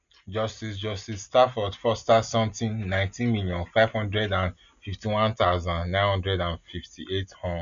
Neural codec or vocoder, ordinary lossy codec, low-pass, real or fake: none; none; 7.2 kHz; real